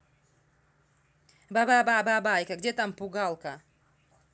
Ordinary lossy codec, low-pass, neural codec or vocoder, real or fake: none; none; none; real